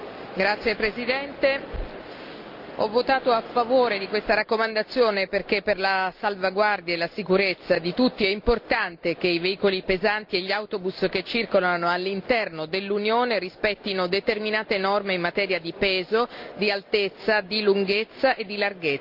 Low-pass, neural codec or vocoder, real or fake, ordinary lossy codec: 5.4 kHz; none; real; Opus, 24 kbps